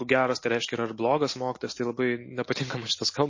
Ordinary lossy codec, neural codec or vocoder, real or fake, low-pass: MP3, 32 kbps; none; real; 7.2 kHz